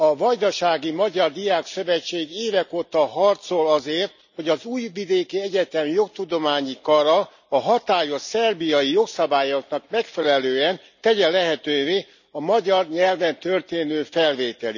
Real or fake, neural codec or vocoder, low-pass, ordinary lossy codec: real; none; 7.2 kHz; none